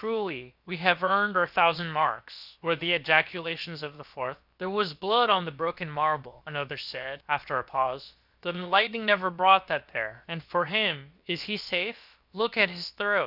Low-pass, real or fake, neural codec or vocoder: 5.4 kHz; fake; codec, 16 kHz, about 1 kbps, DyCAST, with the encoder's durations